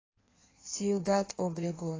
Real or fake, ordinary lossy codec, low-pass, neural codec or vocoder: fake; AAC, 32 kbps; 7.2 kHz; codec, 16 kHz in and 24 kHz out, 1.1 kbps, FireRedTTS-2 codec